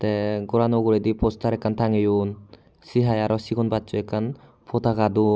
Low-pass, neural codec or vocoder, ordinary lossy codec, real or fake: none; none; none; real